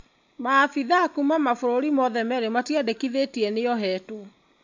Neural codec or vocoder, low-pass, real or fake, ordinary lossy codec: none; 7.2 kHz; real; MP3, 64 kbps